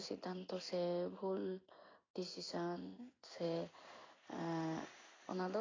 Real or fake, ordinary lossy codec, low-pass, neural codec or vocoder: fake; AAC, 32 kbps; 7.2 kHz; codec, 16 kHz in and 24 kHz out, 1 kbps, XY-Tokenizer